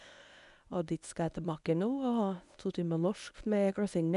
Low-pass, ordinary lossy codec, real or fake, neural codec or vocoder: 10.8 kHz; none; fake; codec, 24 kHz, 0.9 kbps, WavTokenizer, medium speech release version 1